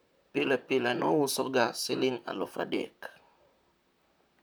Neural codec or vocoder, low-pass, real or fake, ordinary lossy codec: vocoder, 44.1 kHz, 128 mel bands, Pupu-Vocoder; none; fake; none